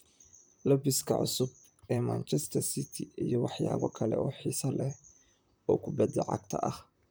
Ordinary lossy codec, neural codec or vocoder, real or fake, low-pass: none; vocoder, 44.1 kHz, 128 mel bands, Pupu-Vocoder; fake; none